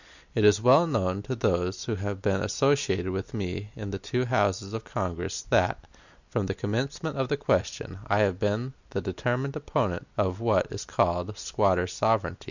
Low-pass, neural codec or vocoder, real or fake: 7.2 kHz; none; real